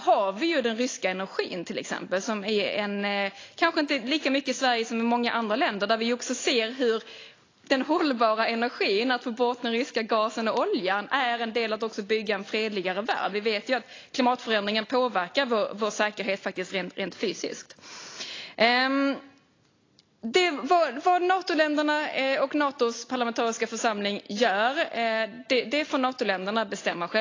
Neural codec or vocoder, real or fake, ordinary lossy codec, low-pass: none; real; AAC, 32 kbps; 7.2 kHz